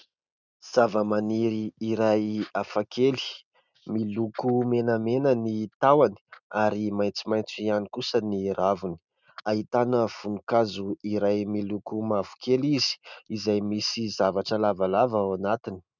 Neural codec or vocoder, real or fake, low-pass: none; real; 7.2 kHz